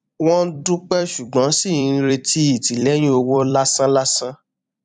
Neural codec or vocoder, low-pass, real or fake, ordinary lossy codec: vocoder, 44.1 kHz, 128 mel bands every 256 samples, BigVGAN v2; 10.8 kHz; fake; none